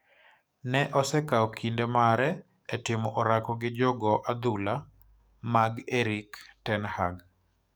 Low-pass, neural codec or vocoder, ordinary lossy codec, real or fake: none; codec, 44.1 kHz, 7.8 kbps, DAC; none; fake